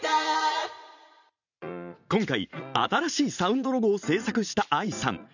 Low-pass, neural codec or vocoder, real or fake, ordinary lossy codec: 7.2 kHz; vocoder, 44.1 kHz, 80 mel bands, Vocos; fake; none